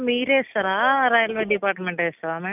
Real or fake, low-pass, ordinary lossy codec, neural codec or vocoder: real; 3.6 kHz; none; none